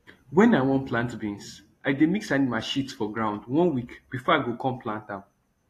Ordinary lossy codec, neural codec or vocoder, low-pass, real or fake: AAC, 48 kbps; none; 14.4 kHz; real